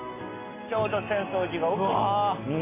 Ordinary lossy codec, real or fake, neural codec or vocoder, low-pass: MP3, 24 kbps; real; none; 3.6 kHz